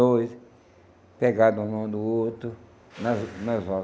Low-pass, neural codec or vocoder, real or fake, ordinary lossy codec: none; none; real; none